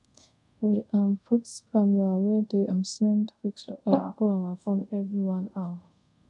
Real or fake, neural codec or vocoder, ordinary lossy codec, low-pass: fake; codec, 24 kHz, 0.5 kbps, DualCodec; none; 10.8 kHz